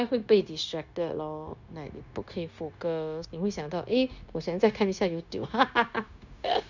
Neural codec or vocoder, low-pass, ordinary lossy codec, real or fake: codec, 16 kHz, 0.9 kbps, LongCat-Audio-Codec; 7.2 kHz; none; fake